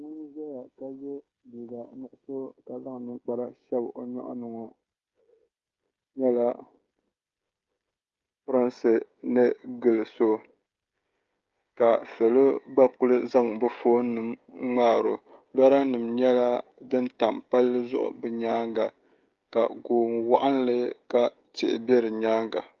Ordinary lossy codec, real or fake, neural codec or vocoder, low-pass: Opus, 32 kbps; fake; codec, 16 kHz, 16 kbps, FreqCodec, smaller model; 7.2 kHz